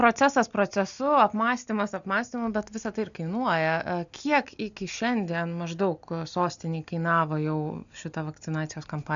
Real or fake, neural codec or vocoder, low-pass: real; none; 7.2 kHz